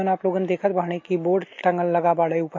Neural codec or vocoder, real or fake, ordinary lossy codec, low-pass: none; real; MP3, 32 kbps; 7.2 kHz